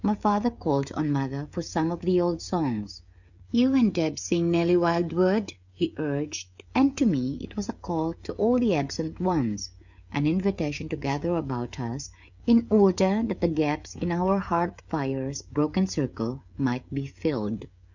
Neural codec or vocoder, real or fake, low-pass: codec, 16 kHz, 16 kbps, FreqCodec, smaller model; fake; 7.2 kHz